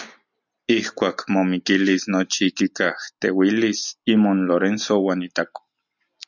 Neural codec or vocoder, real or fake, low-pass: none; real; 7.2 kHz